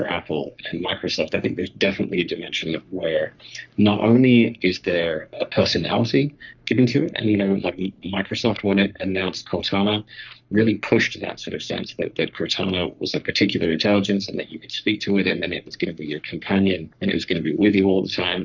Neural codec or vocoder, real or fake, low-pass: codec, 44.1 kHz, 3.4 kbps, Pupu-Codec; fake; 7.2 kHz